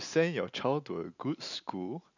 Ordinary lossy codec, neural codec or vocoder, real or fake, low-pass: none; none; real; 7.2 kHz